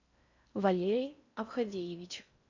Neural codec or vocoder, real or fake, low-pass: codec, 16 kHz in and 24 kHz out, 0.6 kbps, FocalCodec, streaming, 4096 codes; fake; 7.2 kHz